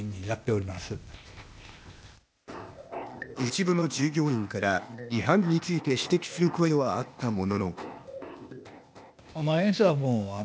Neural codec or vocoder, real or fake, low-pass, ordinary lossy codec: codec, 16 kHz, 0.8 kbps, ZipCodec; fake; none; none